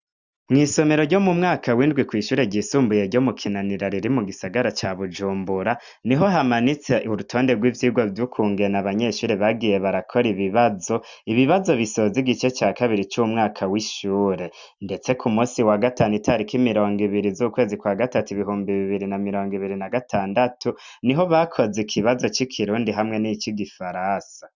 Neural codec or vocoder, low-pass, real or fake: none; 7.2 kHz; real